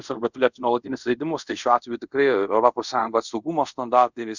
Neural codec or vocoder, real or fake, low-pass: codec, 24 kHz, 0.5 kbps, DualCodec; fake; 7.2 kHz